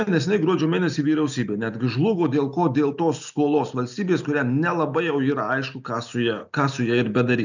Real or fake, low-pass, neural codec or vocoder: real; 7.2 kHz; none